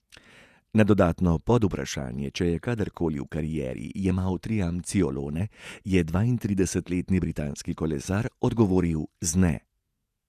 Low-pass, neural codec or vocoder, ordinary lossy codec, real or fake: 14.4 kHz; vocoder, 48 kHz, 128 mel bands, Vocos; none; fake